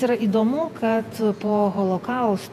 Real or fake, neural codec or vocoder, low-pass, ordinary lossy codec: fake; vocoder, 48 kHz, 128 mel bands, Vocos; 14.4 kHz; MP3, 96 kbps